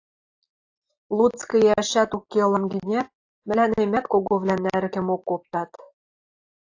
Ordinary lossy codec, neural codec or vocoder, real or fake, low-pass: AAC, 48 kbps; none; real; 7.2 kHz